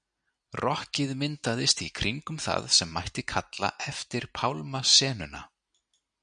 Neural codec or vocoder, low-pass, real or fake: none; 9.9 kHz; real